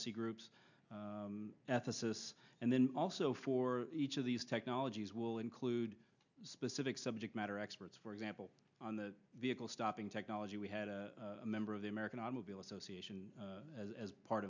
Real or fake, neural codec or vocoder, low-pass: real; none; 7.2 kHz